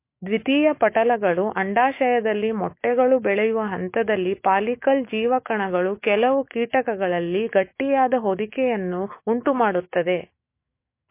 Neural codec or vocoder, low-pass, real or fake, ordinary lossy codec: autoencoder, 48 kHz, 128 numbers a frame, DAC-VAE, trained on Japanese speech; 3.6 kHz; fake; MP3, 24 kbps